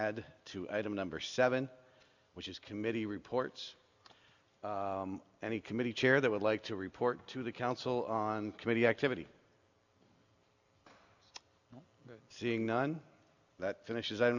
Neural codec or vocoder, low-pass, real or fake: none; 7.2 kHz; real